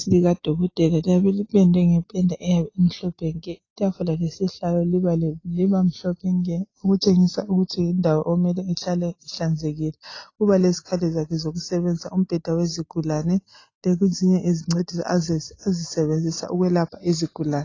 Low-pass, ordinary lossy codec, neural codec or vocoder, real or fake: 7.2 kHz; AAC, 32 kbps; none; real